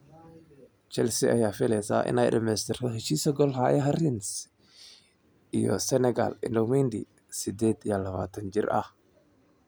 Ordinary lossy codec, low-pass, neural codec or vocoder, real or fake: none; none; none; real